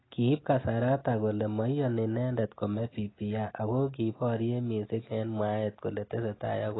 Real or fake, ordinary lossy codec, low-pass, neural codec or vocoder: real; AAC, 16 kbps; 7.2 kHz; none